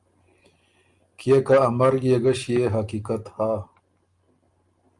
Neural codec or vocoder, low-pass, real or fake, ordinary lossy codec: none; 10.8 kHz; real; Opus, 32 kbps